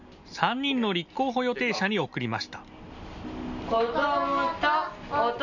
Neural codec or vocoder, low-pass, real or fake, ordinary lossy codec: none; 7.2 kHz; real; none